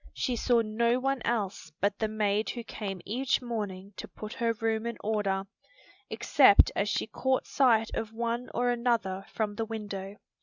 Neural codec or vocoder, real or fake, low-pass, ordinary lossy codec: none; real; 7.2 kHz; Opus, 64 kbps